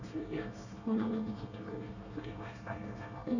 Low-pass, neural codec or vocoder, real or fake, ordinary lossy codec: 7.2 kHz; codec, 24 kHz, 1 kbps, SNAC; fake; none